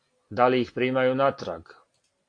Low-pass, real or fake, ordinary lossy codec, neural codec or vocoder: 9.9 kHz; real; AAC, 48 kbps; none